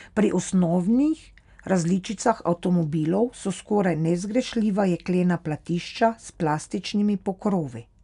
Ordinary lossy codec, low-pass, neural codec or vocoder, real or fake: none; 10.8 kHz; none; real